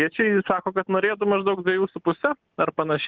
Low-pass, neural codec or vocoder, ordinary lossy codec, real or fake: 7.2 kHz; none; Opus, 32 kbps; real